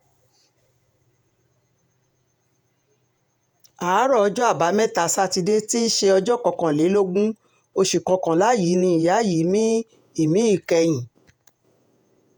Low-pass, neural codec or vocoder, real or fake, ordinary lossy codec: none; vocoder, 48 kHz, 128 mel bands, Vocos; fake; none